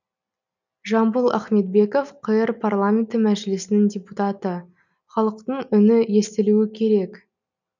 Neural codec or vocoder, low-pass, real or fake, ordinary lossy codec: none; 7.2 kHz; real; none